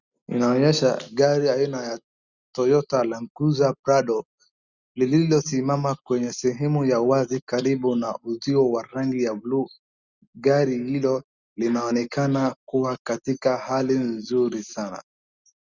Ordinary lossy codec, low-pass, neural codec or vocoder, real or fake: Opus, 64 kbps; 7.2 kHz; none; real